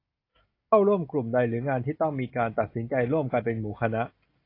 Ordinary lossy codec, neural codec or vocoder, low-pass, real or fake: AAC, 32 kbps; none; 5.4 kHz; real